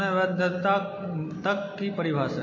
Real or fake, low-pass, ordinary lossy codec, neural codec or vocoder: real; 7.2 kHz; MP3, 32 kbps; none